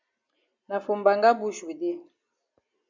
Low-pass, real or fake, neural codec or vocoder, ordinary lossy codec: 7.2 kHz; real; none; MP3, 64 kbps